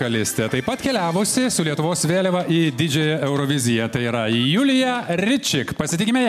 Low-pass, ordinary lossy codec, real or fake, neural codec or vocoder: 14.4 kHz; MP3, 96 kbps; real; none